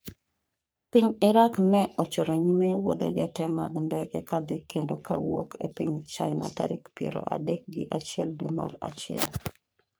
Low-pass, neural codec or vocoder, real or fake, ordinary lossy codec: none; codec, 44.1 kHz, 3.4 kbps, Pupu-Codec; fake; none